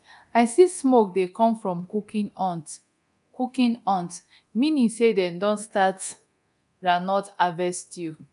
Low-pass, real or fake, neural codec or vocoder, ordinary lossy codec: 10.8 kHz; fake; codec, 24 kHz, 0.9 kbps, DualCodec; none